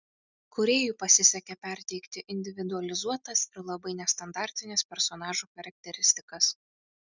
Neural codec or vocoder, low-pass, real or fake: none; 7.2 kHz; real